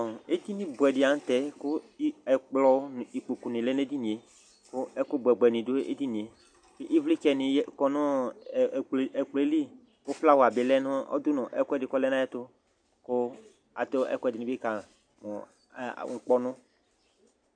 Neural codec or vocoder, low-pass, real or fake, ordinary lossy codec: none; 9.9 kHz; real; MP3, 96 kbps